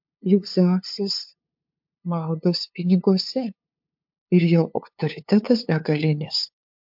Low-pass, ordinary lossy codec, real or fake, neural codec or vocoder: 5.4 kHz; MP3, 48 kbps; fake; codec, 16 kHz, 2 kbps, FunCodec, trained on LibriTTS, 25 frames a second